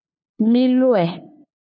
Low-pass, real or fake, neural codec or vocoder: 7.2 kHz; fake; codec, 16 kHz, 2 kbps, FunCodec, trained on LibriTTS, 25 frames a second